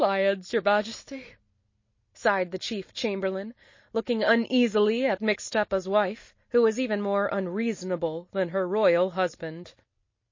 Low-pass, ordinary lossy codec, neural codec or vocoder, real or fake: 7.2 kHz; MP3, 32 kbps; none; real